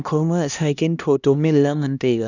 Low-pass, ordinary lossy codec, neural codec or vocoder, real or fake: 7.2 kHz; none; codec, 16 kHz, 1 kbps, X-Codec, HuBERT features, trained on LibriSpeech; fake